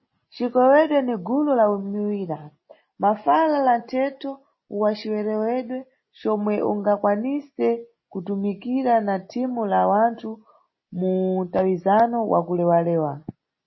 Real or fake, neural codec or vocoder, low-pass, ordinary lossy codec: real; none; 7.2 kHz; MP3, 24 kbps